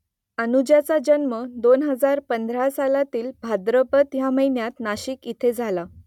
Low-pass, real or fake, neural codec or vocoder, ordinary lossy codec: 19.8 kHz; real; none; none